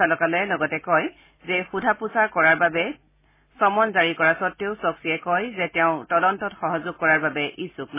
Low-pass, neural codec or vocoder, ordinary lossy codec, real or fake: 3.6 kHz; none; MP3, 16 kbps; real